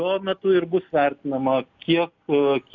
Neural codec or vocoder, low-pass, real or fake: none; 7.2 kHz; real